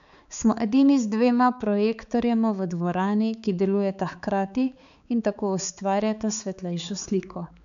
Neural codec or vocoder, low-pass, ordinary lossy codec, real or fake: codec, 16 kHz, 4 kbps, X-Codec, HuBERT features, trained on balanced general audio; 7.2 kHz; none; fake